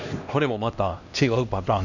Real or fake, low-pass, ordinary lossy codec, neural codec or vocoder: fake; 7.2 kHz; none; codec, 16 kHz, 1 kbps, X-Codec, HuBERT features, trained on LibriSpeech